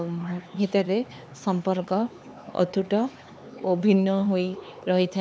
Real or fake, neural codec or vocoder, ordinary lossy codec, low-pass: fake; codec, 16 kHz, 4 kbps, X-Codec, HuBERT features, trained on LibriSpeech; none; none